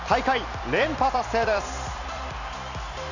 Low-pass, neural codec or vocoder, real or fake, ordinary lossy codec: 7.2 kHz; vocoder, 44.1 kHz, 128 mel bands every 256 samples, BigVGAN v2; fake; none